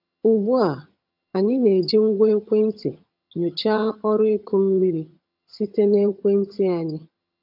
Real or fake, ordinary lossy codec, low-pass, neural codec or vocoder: fake; none; 5.4 kHz; vocoder, 22.05 kHz, 80 mel bands, HiFi-GAN